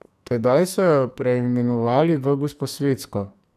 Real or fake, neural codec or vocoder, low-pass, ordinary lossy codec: fake; codec, 44.1 kHz, 2.6 kbps, SNAC; 14.4 kHz; none